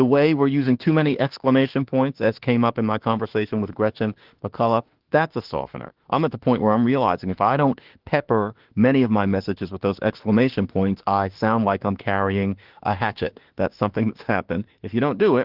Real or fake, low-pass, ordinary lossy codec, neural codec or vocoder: fake; 5.4 kHz; Opus, 16 kbps; autoencoder, 48 kHz, 32 numbers a frame, DAC-VAE, trained on Japanese speech